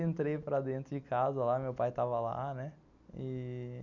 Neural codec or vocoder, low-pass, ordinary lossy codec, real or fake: none; 7.2 kHz; none; real